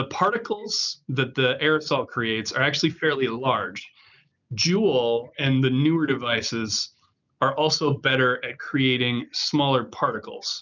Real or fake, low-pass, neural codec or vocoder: real; 7.2 kHz; none